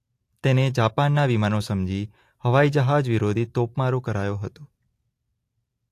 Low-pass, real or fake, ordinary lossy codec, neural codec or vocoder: 14.4 kHz; real; AAC, 64 kbps; none